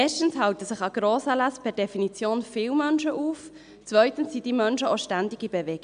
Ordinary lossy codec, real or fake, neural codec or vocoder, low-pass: MP3, 96 kbps; real; none; 9.9 kHz